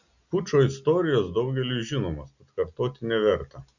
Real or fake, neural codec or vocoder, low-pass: real; none; 7.2 kHz